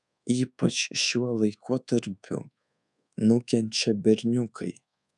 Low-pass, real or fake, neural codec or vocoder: 10.8 kHz; fake; codec, 24 kHz, 1.2 kbps, DualCodec